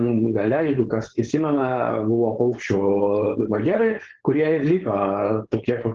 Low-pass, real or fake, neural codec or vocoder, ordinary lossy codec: 7.2 kHz; fake; codec, 16 kHz, 4.8 kbps, FACodec; Opus, 16 kbps